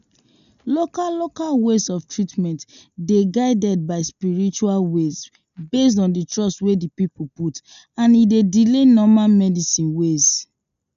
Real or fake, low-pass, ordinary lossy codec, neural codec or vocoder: real; 7.2 kHz; none; none